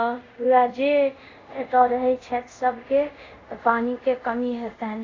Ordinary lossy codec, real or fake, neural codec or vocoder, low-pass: AAC, 32 kbps; fake; codec, 24 kHz, 0.5 kbps, DualCodec; 7.2 kHz